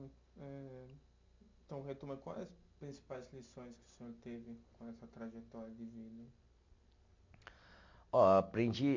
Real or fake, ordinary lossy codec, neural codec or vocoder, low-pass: real; MP3, 48 kbps; none; 7.2 kHz